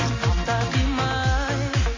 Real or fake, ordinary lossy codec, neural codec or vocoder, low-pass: real; MP3, 32 kbps; none; 7.2 kHz